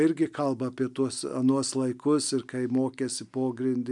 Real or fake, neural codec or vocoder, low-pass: real; none; 10.8 kHz